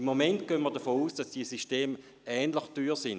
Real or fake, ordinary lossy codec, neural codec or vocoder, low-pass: real; none; none; none